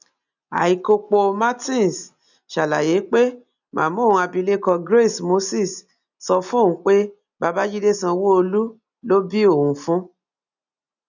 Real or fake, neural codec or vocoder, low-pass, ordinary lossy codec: real; none; 7.2 kHz; none